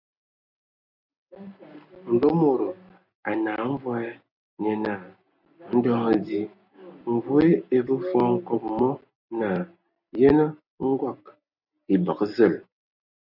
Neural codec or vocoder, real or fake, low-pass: none; real; 5.4 kHz